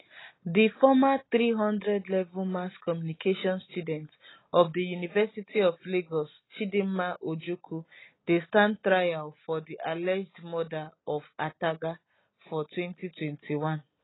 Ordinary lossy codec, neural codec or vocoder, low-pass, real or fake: AAC, 16 kbps; none; 7.2 kHz; real